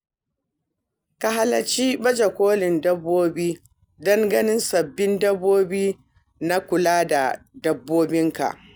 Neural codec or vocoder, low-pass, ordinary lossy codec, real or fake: none; none; none; real